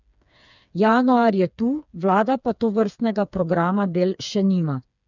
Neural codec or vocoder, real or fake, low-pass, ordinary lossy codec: codec, 16 kHz, 4 kbps, FreqCodec, smaller model; fake; 7.2 kHz; none